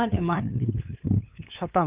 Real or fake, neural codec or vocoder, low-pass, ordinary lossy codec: fake; codec, 16 kHz, 2 kbps, FunCodec, trained on LibriTTS, 25 frames a second; 3.6 kHz; Opus, 32 kbps